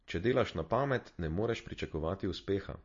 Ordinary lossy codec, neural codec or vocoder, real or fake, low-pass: MP3, 32 kbps; none; real; 7.2 kHz